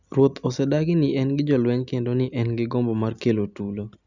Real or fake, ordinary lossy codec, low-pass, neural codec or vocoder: real; none; 7.2 kHz; none